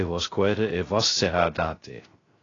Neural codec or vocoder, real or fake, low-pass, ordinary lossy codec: codec, 16 kHz, 0.3 kbps, FocalCodec; fake; 7.2 kHz; AAC, 32 kbps